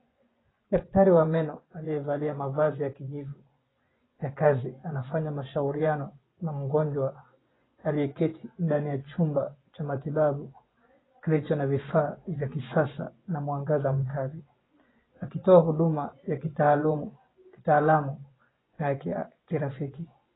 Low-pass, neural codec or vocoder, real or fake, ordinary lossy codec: 7.2 kHz; vocoder, 24 kHz, 100 mel bands, Vocos; fake; AAC, 16 kbps